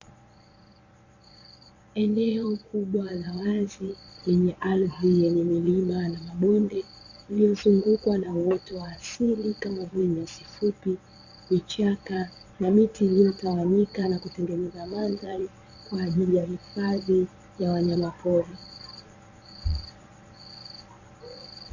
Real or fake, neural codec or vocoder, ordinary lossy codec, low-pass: fake; vocoder, 22.05 kHz, 80 mel bands, Vocos; Opus, 64 kbps; 7.2 kHz